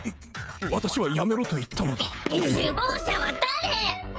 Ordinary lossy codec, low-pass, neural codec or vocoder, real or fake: none; none; codec, 16 kHz, 16 kbps, FreqCodec, smaller model; fake